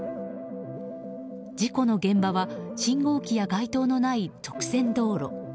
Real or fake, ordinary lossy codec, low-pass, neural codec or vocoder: real; none; none; none